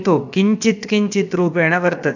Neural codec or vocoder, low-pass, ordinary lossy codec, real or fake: codec, 16 kHz, about 1 kbps, DyCAST, with the encoder's durations; 7.2 kHz; none; fake